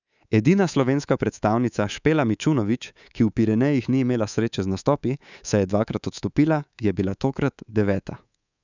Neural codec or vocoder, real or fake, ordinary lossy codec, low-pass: codec, 24 kHz, 3.1 kbps, DualCodec; fake; none; 7.2 kHz